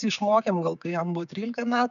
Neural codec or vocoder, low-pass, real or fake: codec, 16 kHz, 6 kbps, DAC; 7.2 kHz; fake